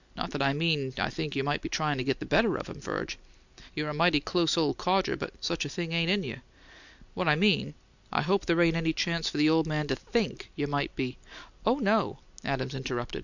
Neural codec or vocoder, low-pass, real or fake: none; 7.2 kHz; real